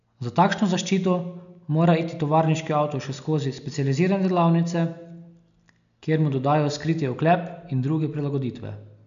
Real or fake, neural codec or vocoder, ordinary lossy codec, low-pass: real; none; none; 7.2 kHz